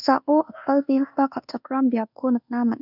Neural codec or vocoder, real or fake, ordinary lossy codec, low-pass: codec, 24 kHz, 1.2 kbps, DualCodec; fake; none; 5.4 kHz